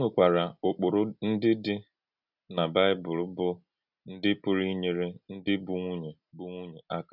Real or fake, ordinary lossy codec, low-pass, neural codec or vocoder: real; none; 5.4 kHz; none